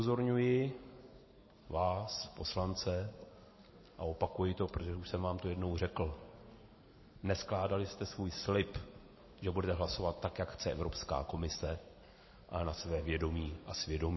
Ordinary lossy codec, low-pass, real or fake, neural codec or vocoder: MP3, 24 kbps; 7.2 kHz; real; none